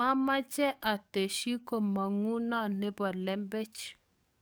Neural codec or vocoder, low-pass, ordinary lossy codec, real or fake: codec, 44.1 kHz, 7.8 kbps, DAC; none; none; fake